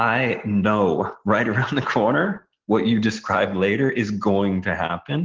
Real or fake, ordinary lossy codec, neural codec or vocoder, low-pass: real; Opus, 16 kbps; none; 7.2 kHz